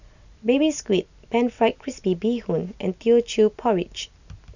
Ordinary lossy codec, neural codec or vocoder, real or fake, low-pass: none; none; real; 7.2 kHz